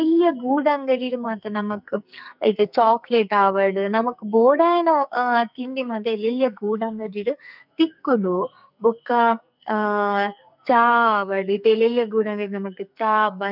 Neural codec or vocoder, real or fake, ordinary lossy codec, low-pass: codec, 44.1 kHz, 2.6 kbps, SNAC; fake; none; 5.4 kHz